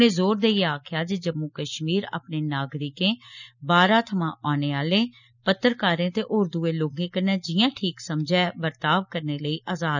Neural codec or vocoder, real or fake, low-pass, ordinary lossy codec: none; real; 7.2 kHz; none